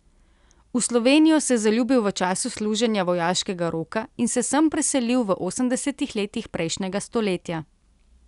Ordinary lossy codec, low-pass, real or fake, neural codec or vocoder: none; 10.8 kHz; real; none